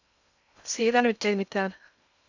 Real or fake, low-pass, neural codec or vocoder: fake; 7.2 kHz; codec, 16 kHz in and 24 kHz out, 0.6 kbps, FocalCodec, streaming, 2048 codes